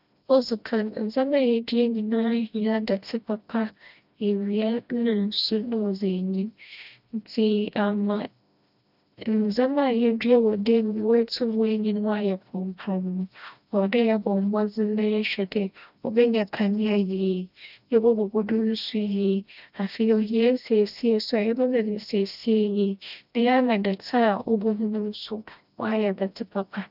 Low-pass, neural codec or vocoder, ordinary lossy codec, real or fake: 5.4 kHz; codec, 16 kHz, 1 kbps, FreqCodec, smaller model; none; fake